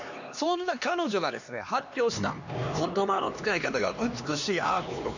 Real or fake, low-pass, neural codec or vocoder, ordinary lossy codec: fake; 7.2 kHz; codec, 16 kHz, 2 kbps, X-Codec, HuBERT features, trained on LibriSpeech; none